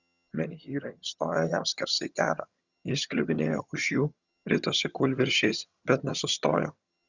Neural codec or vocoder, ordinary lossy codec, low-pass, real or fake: vocoder, 22.05 kHz, 80 mel bands, HiFi-GAN; Opus, 64 kbps; 7.2 kHz; fake